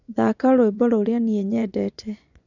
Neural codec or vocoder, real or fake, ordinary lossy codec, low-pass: vocoder, 24 kHz, 100 mel bands, Vocos; fake; none; 7.2 kHz